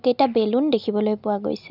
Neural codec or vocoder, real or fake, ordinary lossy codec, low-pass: none; real; none; 5.4 kHz